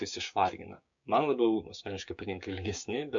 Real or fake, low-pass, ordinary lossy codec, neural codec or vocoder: fake; 7.2 kHz; MP3, 64 kbps; codec, 16 kHz, 6 kbps, DAC